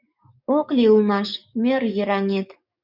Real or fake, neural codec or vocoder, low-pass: fake; codec, 44.1 kHz, 7.8 kbps, DAC; 5.4 kHz